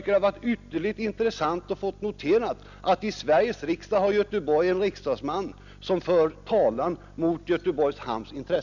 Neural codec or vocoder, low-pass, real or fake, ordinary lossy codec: none; 7.2 kHz; real; none